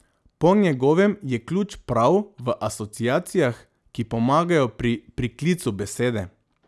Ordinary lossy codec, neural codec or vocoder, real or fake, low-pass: none; none; real; none